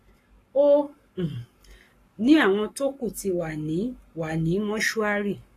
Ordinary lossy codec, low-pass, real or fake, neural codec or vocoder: AAC, 48 kbps; 14.4 kHz; fake; codec, 44.1 kHz, 7.8 kbps, Pupu-Codec